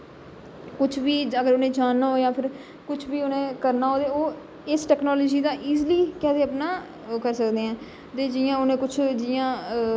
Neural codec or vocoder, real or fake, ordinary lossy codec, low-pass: none; real; none; none